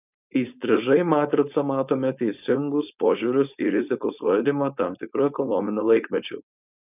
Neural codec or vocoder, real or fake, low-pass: codec, 16 kHz, 4.8 kbps, FACodec; fake; 3.6 kHz